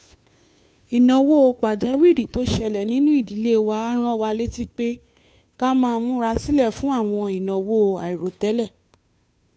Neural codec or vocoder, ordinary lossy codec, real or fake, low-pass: codec, 16 kHz, 2 kbps, FunCodec, trained on Chinese and English, 25 frames a second; none; fake; none